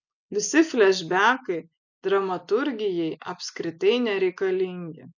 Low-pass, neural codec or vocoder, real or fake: 7.2 kHz; none; real